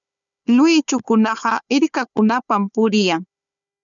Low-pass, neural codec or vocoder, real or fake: 7.2 kHz; codec, 16 kHz, 4 kbps, FunCodec, trained on Chinese and English, 50 frames a second; fake